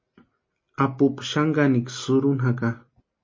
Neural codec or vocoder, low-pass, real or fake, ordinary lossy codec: none; 7.2 kHz; real; MP3, 32 kbps